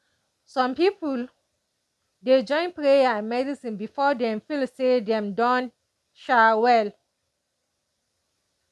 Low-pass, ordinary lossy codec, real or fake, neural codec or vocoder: none; none; real; none